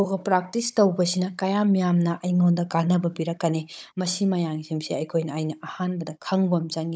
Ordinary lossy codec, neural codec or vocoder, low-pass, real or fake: none; codec, 16 kHz, 16 kbps, FunCodec, trained on Chinese and English, 50 frames a second; none; fake